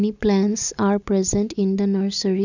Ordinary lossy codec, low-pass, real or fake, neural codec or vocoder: none; 7.2 kHz; real; none